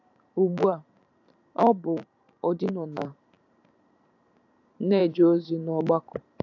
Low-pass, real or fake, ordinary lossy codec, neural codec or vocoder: 7.2 kHz; fake; AAC, 48 kbps; vocoder, 44.1 kHz, 128 mel bands every 512 samples, BigVGAN v2